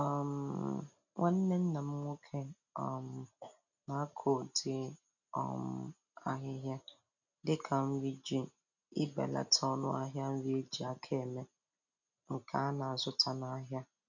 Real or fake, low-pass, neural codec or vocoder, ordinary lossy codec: real; 7.2 kHz; none; none